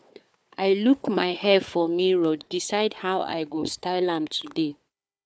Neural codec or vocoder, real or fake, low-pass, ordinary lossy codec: codec, 16 kHz, 4 kbps, FunCodec, trained on Chinese and English, 50 frames a second; fake; none; none